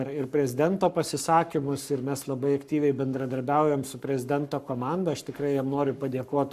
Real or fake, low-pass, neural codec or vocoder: fake; 14.4 kHz; codec, 44.1 kHz, 7.8 kbps, Pupu-Codec